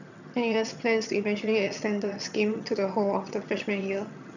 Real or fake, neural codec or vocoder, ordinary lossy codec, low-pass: fake; vocoder, 22.05 kHz, 80 mel bands, HiFi-GAN; none; 7.2 kHz